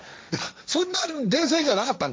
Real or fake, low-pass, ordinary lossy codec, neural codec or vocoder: fake; none; none; codec, 16 kHz, 1.1 kbps, Voila-Tokenizer